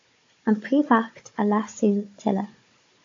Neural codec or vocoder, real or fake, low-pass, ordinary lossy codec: codec, 16 kHz, 16 kbps, FunCodec, trained on Chinese and English, 50 frames a second; fake; 7.2 kHz; AAC, 48 kbps